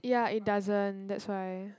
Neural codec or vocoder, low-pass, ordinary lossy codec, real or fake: none; none; none; real